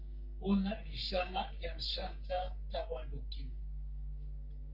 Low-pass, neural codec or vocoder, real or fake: 5.4 kHz; codec, 44.1 kHz, 3.4 kbps, Pupu-Codec; fake